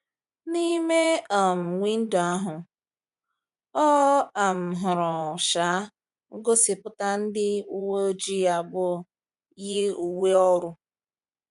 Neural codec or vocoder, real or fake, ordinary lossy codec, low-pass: vocoder, 24 kHz, 100 mel bands, Vocos; fake; none; 10.8 kHz